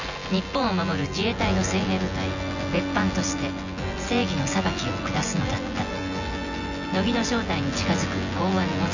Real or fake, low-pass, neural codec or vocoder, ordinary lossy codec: fake; 7.2 kHz; vocoder, 24 kHz, 100 mel bands, Vocos; none